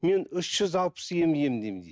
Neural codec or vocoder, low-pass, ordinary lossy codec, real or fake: none; none; none; real